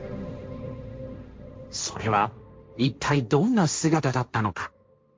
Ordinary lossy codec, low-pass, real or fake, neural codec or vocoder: none; none; fake; codec, 16 kHz, 1.1 kbps, Voila-Tokenizer